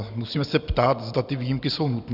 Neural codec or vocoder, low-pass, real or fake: none; 5.4 kHz; real